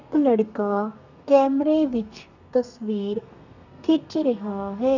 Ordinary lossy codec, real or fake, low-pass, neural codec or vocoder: none; fake; 7.2 kHz; codec, 32 kHz, 1.9 kbps, SNAC